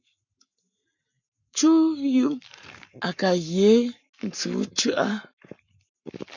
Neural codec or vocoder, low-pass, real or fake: codec, 44.1 kHz, 7.8 kbps, Pupu-Codec; 7.2 kHz; fake